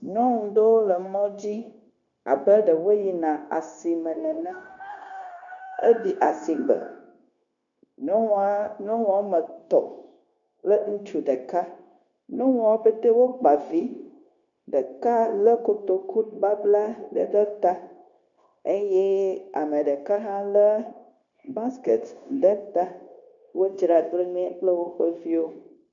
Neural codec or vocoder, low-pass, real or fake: codec, 16 kHz, 0.9 kbps, LongCat-Audio-Codec; 7.2 kHz; fake